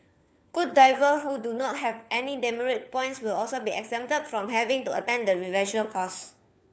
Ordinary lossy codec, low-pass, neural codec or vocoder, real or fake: none; none; codec, 16 kHz, 4 kbps, FunCodec, trained on LibriTTS, 50 frames a second; fake